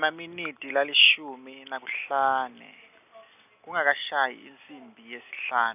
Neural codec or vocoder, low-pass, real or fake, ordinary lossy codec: none; 3.6 kHz; real; none